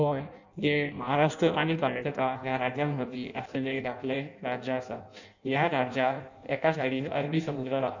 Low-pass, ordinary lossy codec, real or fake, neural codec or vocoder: 7.2 kHz; none; fake; codec, 16 kHz in and 24 kHz out, 0.6 kbps, FireRedTTS-2 codec